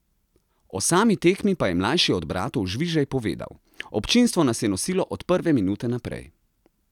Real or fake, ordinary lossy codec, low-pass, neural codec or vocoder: real; none; 19.8 kHz; none